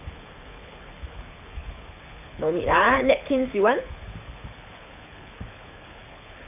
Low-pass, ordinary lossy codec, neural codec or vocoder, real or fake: 3.6 kHz; none; codec, 24 kHz, 6 kbps, HILCodec; fake